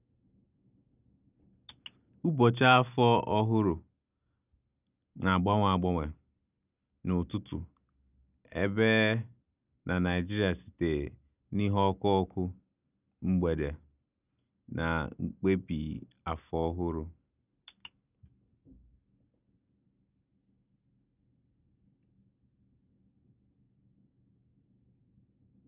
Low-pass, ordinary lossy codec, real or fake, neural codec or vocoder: 3.6 kHz; none; real; none